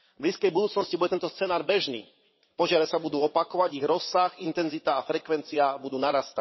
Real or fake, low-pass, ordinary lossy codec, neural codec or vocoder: fake; 7.2 kHz; MP3, 24 kbps; vocoder, 22.05 kHz, 80 mel bands, WaveNeXt